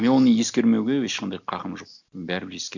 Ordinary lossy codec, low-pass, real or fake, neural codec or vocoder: none; 7.2 kHz; real; none